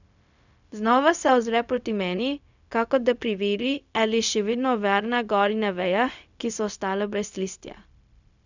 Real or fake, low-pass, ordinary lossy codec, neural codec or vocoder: fake; 7.2 kHz; none; codec, 16 kHz, 0.4 kbps, LongCat-Audio-Codec